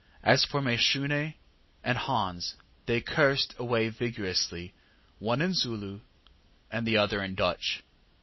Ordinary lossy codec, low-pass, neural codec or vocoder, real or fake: MP3, 24 kbps; 7.2 kHz; none; real